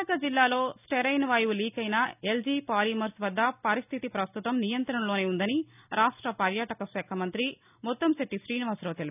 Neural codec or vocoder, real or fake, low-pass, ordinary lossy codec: none; real; 3.6 kHz; none